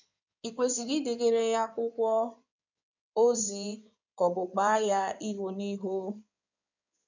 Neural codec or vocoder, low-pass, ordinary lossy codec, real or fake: codec, 16 kHz in and 24 kHz out, 2.2 kbps, FireRedTTS-2 codec; 7.2 kHz; none; fake